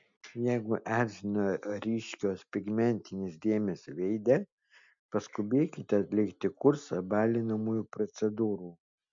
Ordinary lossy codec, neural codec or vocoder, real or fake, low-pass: MP3, 64 kbps; none; real; 7.2 kHz